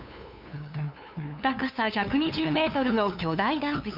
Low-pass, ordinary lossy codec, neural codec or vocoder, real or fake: 5.4 kHz; none; codec, 16 kHz, 2 kbps, FunCodec, trained on LibriTTS, 25 frames a second; fake